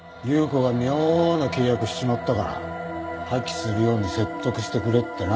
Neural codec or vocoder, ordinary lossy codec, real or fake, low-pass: none; none; real; none